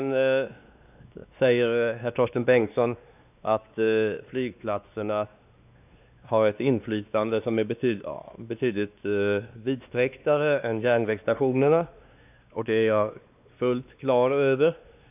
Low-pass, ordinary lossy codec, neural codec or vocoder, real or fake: 3.6 kHz; none; codec, 16 kHz, 2 kbps, X-Codec, WavLM features, trained on Multilingual LibriSpeech; fake